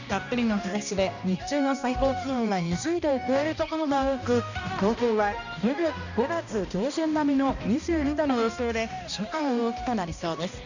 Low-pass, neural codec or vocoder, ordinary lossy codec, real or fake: 7.2 kHz; codec, 16 kHz, 1 kbps, X-Codec, HuBERT features, trained on balanced general audio; none; fake